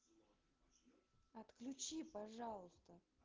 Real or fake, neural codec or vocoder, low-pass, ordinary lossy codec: real; none; 7.2 kHz; Opus, 16 kbps